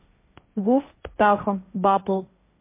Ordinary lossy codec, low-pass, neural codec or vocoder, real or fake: MP3, 24 kbps; 3.6 kHz; codec, 16 kHz, 1.1 kbps, Voila-Tokenizer; fake